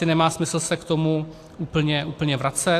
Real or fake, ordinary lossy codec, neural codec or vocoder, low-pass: real; AAC, 64 kbps; none; 14.4 kHz